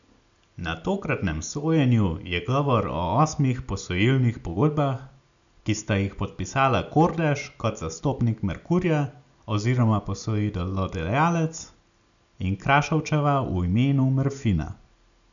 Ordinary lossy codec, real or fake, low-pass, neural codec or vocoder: none; real; 7.2 kHz; none